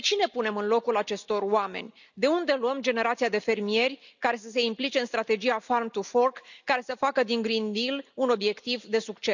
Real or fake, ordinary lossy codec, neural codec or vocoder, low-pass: real; none; none; 7.2 kHz